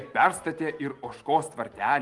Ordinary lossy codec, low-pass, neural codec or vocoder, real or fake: Opus, 24 kbps; 10.8 kHz; none; real